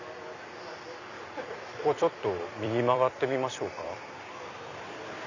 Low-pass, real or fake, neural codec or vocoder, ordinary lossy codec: 7.2 kHz; fake; vocoder, 44.1 kHz, 128 mel bands every 256 samples, BigVGAN v2; none